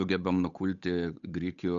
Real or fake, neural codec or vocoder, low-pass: fake; codec, 16 kHz, 8 kbps, FunCodec, trained on Chinese and English, 25 frames a second; 7.2 kHz